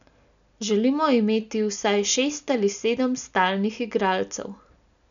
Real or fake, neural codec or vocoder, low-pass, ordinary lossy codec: real; none; 7.2 kHz; none